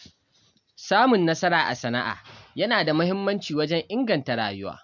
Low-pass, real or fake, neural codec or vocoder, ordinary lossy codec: 7.2 kHz; real; none; none